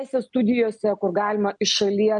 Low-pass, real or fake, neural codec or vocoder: 9.9 kHz; real; none